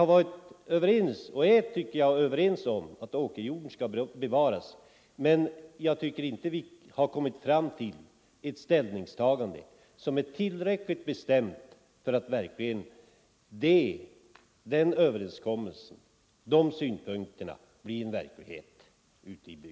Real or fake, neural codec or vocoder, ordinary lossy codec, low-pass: real; none; none; none